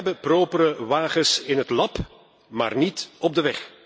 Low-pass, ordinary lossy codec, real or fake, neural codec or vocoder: none; none; real; none